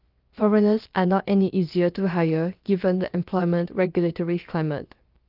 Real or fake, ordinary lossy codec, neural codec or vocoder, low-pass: fake; Opus, 32 kbps; codec, 16 kHz, about 1 kbps, DyCAST, with the encoder's durations; 5.4 kHz